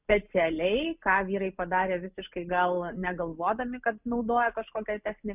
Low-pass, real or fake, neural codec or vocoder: 3.6 kHz; real; none